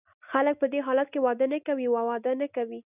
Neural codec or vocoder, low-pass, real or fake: none; 3.6 kHz; real